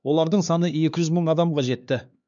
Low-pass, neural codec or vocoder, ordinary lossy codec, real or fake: 7.2 kHz; codec, 16 kHz, 2 kbps, X-Codec, HuBERT features, trained on LibriSpeech; none; fake